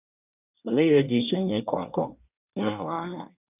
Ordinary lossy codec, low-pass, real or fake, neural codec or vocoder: none; 3.6 kHz; fake; codec, 24 kHz, 1 kbps, SNAC